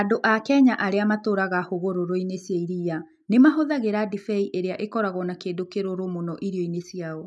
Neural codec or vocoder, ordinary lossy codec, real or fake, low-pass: none; none; real; none